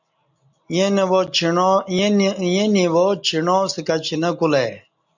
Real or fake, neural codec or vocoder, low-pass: real; none; 7.2 kHz